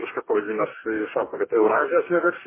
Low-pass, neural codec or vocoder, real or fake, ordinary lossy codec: 3.6 kHz; codec, 44.1 kHz, 2.6 kbps, DAC; fake; MP3, 16 kbps